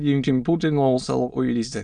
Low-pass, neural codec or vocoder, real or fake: 9.9 kHz; autoencoder, 22.05 kHz, a latent of 192 numbers a frame, VITS, trained on many speakers; fake